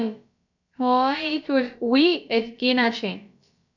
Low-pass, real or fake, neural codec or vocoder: 7.2 kHz; fake; codec, 16 kHz, about 1 kbps, DyCAST, with the encoder's durations